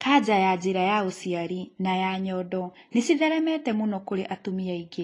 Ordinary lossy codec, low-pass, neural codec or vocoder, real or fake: AAC, 32 kbps; 10.8 kHz; none; real